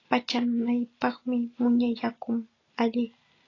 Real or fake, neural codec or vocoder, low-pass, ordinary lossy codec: real; none; 7.2 kHz; AAC, 32 kbps